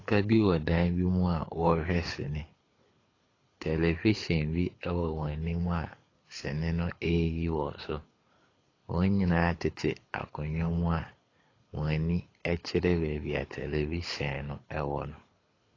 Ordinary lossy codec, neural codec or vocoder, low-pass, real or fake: AAC, 32 kbps; codec, 24 kHz, 6 kbps, HILCodec; 7.2 kHz; fake